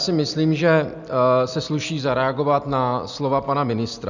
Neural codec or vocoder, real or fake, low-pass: none; real; 7.2 kHz